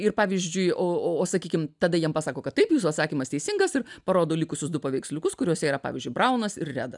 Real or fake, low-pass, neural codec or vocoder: real; 10.8 kHz; none